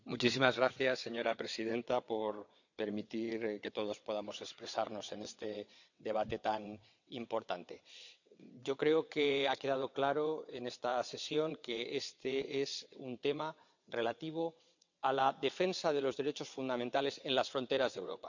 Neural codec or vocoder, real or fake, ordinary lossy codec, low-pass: vocoder, 22.05 kHz, 80 mel bands, WaveNeXt; fake; none; 7.2 kHz